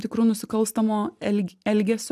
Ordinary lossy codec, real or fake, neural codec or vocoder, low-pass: AAC, 96 kbps; real; none; 14.4 kHz